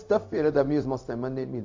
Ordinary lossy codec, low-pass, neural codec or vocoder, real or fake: MP3, 48 kbps; 7.2 kHz; codec, 16 kHz, 0.4 kbps, LongCat-Audio-Codec; fake